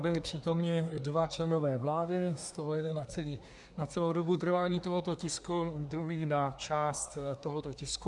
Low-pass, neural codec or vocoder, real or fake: 10.8 kHz; codec, 24 kHz, 1 kbps, SNAC; fake